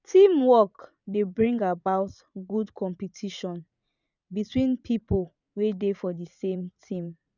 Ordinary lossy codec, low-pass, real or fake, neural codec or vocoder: none; 7.2 kHz; fake; vocoder, 44.1 kHz, 80 mel bands, Vocos